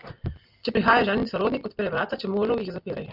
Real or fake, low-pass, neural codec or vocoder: real; 5.4 kHz; none